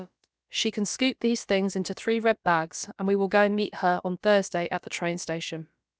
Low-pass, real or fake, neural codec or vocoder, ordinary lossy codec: none; fake; codec, 16 kHz, about 1 kbps, DyCAST, with the encoder's durations; none